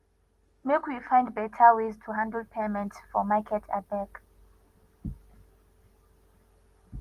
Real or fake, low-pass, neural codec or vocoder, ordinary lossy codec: real; 14.4 kHz; none; Opus, 24 kbps